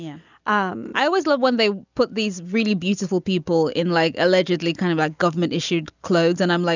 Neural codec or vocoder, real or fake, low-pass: none; real; 7.2 kHz